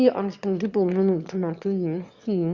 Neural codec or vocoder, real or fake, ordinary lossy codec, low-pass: autoencoder, 22.05 kHz, a latent of 192 numbers a frame, VITS, trained on one speaker; fake; Opus, 64 kbps; 7.2 kHz